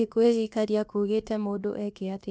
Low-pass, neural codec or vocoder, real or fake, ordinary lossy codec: none; codec, 16 kHz, about 1 kbps, DyCAST, with the encoder's durations; fake; none